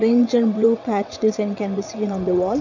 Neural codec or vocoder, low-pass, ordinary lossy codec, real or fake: vocoder, 22.05 kHz, 80 mel bands, WaveNeXt; 7.2 kHz; none; fake